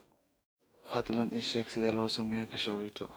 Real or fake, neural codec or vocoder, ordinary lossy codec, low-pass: fake; codec, 44.1 kHz, 2.6 kbps, DAC; none; none